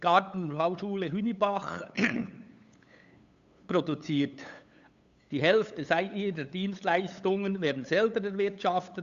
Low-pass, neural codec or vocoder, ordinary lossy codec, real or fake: 7.2 kHz; codec, 16 kHz, 8 kbps, FunCodec, trained on LibriTTS, 25 frames a second; Opus, 64 kbps; fake